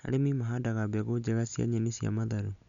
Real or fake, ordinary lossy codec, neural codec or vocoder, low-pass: real; none; none; 7.2 kHz